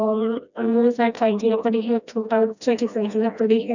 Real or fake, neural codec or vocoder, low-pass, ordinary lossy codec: fake; codec, 16 kHz, 1 kbps, FreqCodec, smaller model; 7.2 kHz; none